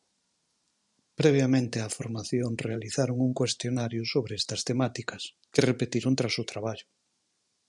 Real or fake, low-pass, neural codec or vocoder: fake; 10.8 kHz; vocoder, 44.1 kHz, 128 mel bands every 512 samples, BigVGAN v2